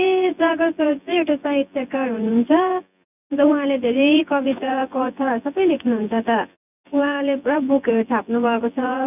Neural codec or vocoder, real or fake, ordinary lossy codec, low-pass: vocoder, 24 kHz, 100 mel bands, Vocos; fake; none; 3.6 kHz